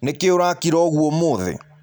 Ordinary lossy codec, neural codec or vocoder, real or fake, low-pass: none; none; real; none